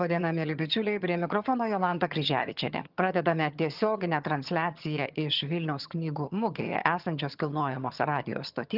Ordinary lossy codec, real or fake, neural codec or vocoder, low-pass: Opus, 32 kbps; fake; vocoder, 22.05 kHz, 80 mel bands, HiFi-GAN; 5.4 kHz